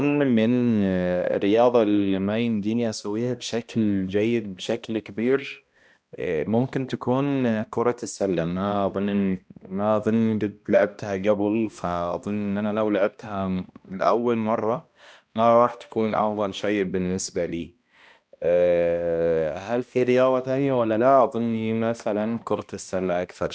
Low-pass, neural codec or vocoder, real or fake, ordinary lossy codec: none; codec, 16 kHz, 1 kbps, X-Codec, HuBERT features, trained on balanced general audio; fake; none